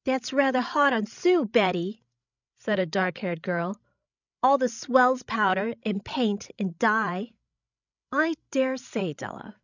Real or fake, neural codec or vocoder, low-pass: fake; codec, 16 kHz, 16 kbps, FreqCodec, larger model; 7.2 kHz